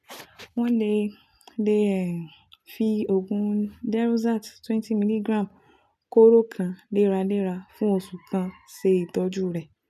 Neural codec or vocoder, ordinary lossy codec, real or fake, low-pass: none; none; real; 14.4 kHz